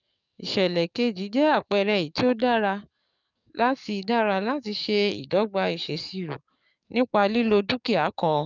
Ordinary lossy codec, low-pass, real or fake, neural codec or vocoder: none; 7.2 kHz; fake; codec, 44.1 kHz, 7.8 kbps, DAC